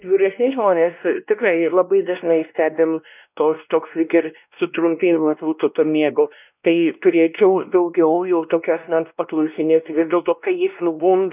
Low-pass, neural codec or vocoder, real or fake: 3.6 kHz; codec, 16 kHz, 1 kbps, X-Codec, WavLM features, trained on Multilingual LibriSpeech; fake